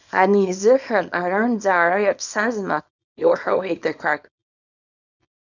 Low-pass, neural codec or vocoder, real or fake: 7.2 kHz; codec, 24 kHz, 0.9 kbps, WavTokenizer, small release; fake